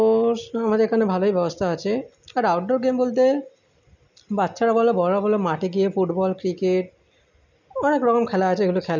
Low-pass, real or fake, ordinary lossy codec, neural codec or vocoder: 7.2 kHz; real; none; none